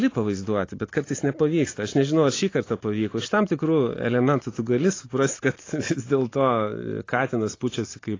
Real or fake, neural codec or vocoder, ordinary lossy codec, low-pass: real; none; AAC, 32 kbps; 7.2 kHz